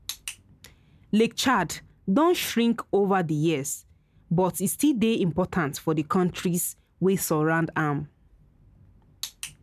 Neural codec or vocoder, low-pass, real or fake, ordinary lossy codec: none; 14.4 kHz; real; none